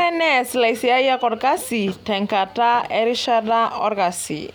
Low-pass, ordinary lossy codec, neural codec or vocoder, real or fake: none; none; none; real